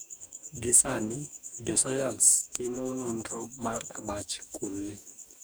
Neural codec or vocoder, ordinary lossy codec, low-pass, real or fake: codec, 44.1 kHz, 2.6 kbps, DAC; none; none; fake